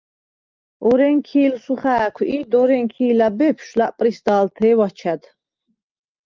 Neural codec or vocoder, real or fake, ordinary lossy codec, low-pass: none; real; Opus, 32 kbps; 7.2 kHz